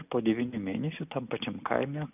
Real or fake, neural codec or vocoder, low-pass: real; none; 3.6 kHz